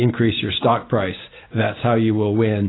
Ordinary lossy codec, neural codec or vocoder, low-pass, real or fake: AAC, 16 kbps; none; 7.2 kHz; real